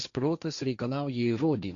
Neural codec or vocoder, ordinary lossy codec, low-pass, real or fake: codec, 16 kHz, 1.1 kbps, Voila-Tokenizer; Opus, 64 kbps; 7.2 kHz; fake